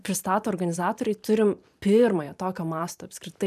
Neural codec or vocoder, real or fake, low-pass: vocoder, 44.1 kHz, 128 mel bands every 512 samples, BigVGAN v2; fake; 14.4 kHz